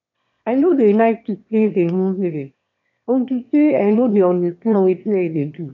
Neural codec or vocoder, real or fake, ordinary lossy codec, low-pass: autoencoder, 22.05 kHz, a latent of 192 numbers a frame, VITS, trained on one speaker; fake; none; 7.2 kHz